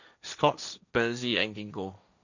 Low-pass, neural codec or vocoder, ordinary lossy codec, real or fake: 7.2 kHz; codec, 16 kHz, 1.1 kbps, Voila-Tokenizer; none; fake